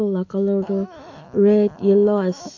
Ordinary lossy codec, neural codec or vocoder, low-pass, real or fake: AAC, 48 kbps; autoencoder, 48 kHz, 128 numbers a frame, DAC-VAE, trained on Japanese speech; 7.2 kHz; fake